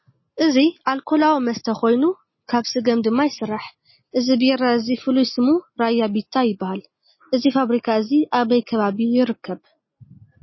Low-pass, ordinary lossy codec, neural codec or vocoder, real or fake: 7.2 kHz; MP3, 24 kbps; none; real